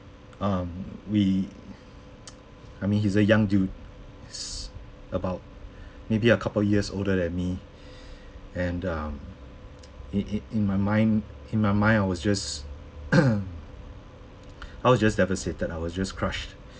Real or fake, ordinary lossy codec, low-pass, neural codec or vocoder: real; none; none; none